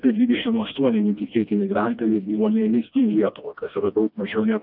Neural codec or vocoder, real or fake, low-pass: codec, 16 kHz, 1 kbps, FreqCodec, smaller model; fake; 5.4 kHz